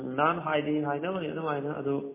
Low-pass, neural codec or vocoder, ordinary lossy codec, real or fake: 3.6 kHz; none; MP3, 16 kbps; real